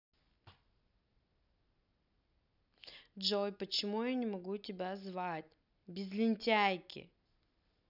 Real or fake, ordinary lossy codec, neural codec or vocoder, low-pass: real; none; none; 5.4 kHz